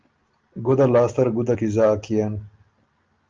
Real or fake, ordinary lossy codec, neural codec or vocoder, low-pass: real; Opus, 24 kbps; none; 7.2 kHz